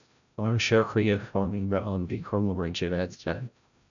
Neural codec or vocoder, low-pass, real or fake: codec, 16 kHz, 0.5 kbps, FreqCodec, larger model; 7.2 kHz; fake